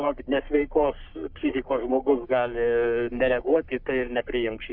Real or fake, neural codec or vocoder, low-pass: fake; codec, 44.1 kHz, 3.4 kbps, Pupu-Codec; 5.4 kHz